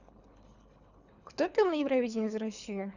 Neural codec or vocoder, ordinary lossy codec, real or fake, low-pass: codec, 24 kHz, 3 kbps, HILCodec; none; fake; 7.2 kHz